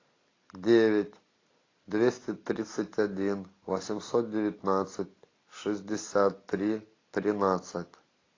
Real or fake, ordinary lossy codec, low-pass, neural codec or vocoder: real; AAC, 32 kbps; 7.2 kHz; none